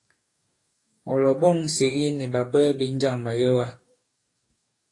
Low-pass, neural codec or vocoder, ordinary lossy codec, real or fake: 10.8 kHz; codec, 44.1 kHz, 2.6 kbps, DAC; AAC, 48 kbps; fake